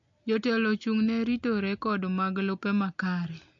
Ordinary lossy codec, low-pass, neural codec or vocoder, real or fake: MP3, 48 kbps; 7.2 kHz; none; real